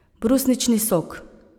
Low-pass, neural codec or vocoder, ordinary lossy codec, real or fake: none; none; none; real